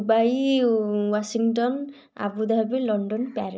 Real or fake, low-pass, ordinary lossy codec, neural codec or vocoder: real; none; none; none